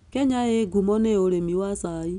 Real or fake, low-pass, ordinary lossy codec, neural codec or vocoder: real; 10.8 kHz; none; none